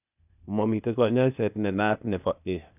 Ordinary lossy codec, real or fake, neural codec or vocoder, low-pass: none; fake; codec, 16 kHz, 0.8 kbps, ZipCodec; 3.6 kHz